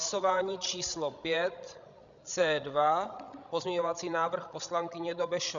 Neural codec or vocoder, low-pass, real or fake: codec, 16 kHz, 16 kbps, FreqCodec, larger model; 7.2 kHz; fake